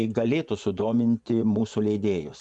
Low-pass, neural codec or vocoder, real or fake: 10.8 kHz; vocoder, 24 kHz, 100 mel bands, Vocos; fake